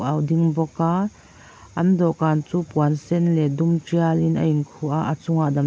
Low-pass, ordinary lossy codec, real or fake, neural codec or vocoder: none; none; real; none